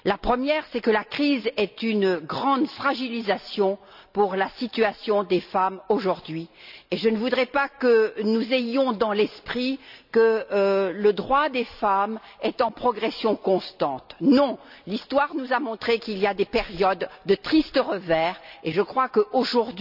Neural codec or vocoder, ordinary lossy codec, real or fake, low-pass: none; none; real; 5.4 kHz